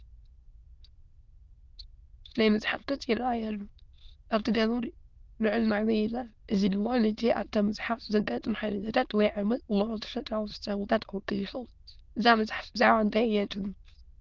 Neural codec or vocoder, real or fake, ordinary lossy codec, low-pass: autoencoder, 22.05 kHz, a latent of 192 numbers a frame, VITS, trained on many speakers; fake; Opus, 32 kbps; 7.2 kHz